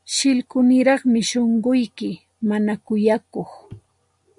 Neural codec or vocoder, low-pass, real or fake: none; 10.8 kHz; real